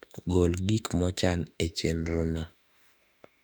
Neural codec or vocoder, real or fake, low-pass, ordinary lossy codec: autoencoder, 48 kHz, 32 numbers a frame, DAC-VAE, trained on Japanese speech; fake; 19.8 kHz; none